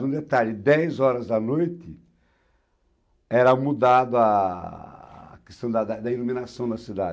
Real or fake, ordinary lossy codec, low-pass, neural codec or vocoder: real; none; none; none